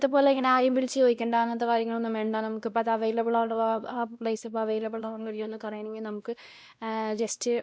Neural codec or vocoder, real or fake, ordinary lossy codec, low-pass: codec, 16 kHz, 1 kbps, X-Codec, WavLM features, trained on Multilingual LibriSpeech; fake; none; none